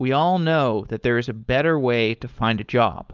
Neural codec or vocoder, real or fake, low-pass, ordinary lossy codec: codec, 16 kHz, 4.8 kbps, FACodec; fake; 7.2 kHz; Opus, 24 kbps